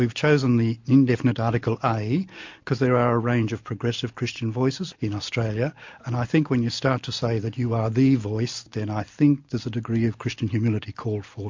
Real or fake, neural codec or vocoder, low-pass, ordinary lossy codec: real; none; 7.2 kHz; MP3, 48 kbps